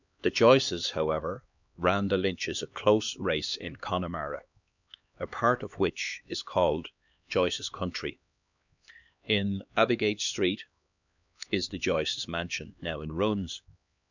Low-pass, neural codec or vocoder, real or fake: 7.2 kHz; codec, 16 kHz, 2 kbps, X-Codec, HuBERT features, trained on LibriSpeech; fake